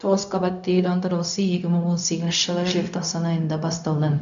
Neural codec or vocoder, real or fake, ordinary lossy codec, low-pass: codec, 16 kHz, 0.4 kbps, LongCat-Audio-Codec; fake; none; 7.2 kHz